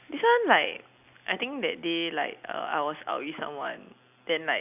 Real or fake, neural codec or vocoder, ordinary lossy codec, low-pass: real; none; none; 3.6 kHz